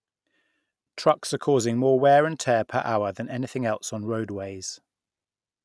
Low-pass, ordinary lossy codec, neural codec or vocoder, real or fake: none; none; none; real